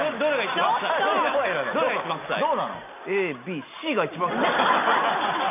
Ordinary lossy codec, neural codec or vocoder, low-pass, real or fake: none; none; 3.6 kHz; real